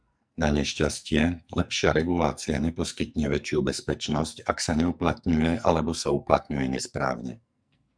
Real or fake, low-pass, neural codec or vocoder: fake; 9.9 kHz; codec, 44.1 kHz, 2.6 kbps, SNAC